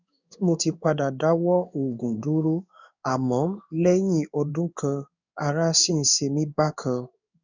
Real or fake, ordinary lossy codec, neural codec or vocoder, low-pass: fake; none; codec, 16 kHz in and 24 kHz out, 1 kbps, XY-Tokenizer; 7.2 kHz